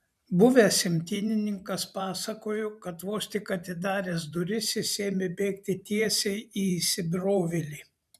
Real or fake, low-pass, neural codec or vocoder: fake; 14.4 kHz; vocoder, 44.1 kHz, 128 mel bands every 512 samples, BigVGAN v2